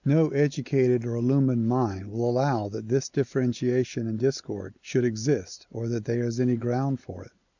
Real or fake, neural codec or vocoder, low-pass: real; none; 7.2 kHz